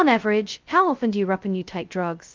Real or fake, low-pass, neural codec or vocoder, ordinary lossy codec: fake; 7.2 kHz; codec, 16 kHz, 0.2 kbps, FocalCodec; Opus, 32 kbps